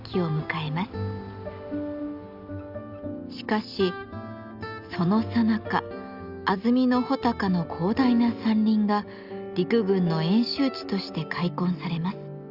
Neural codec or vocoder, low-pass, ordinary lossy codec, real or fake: none; 5.4 kHz; Opus, 64 kbps; real